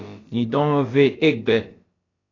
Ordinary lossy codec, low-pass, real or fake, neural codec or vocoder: AAC, 32 kbps; 7.2 kHz; fake; codec, 16 kHz, about 1 kbps, DyCAST, with the encoder's durations